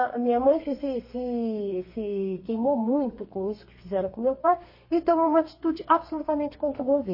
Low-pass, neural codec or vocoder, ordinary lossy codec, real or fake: 5.4 kHz; codec, 32 kHz, 1.9 kbps, SNAC; MP3, 24 kbps; fake